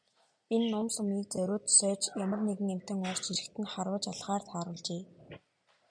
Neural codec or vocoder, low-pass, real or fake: none; 9.9 kHz; real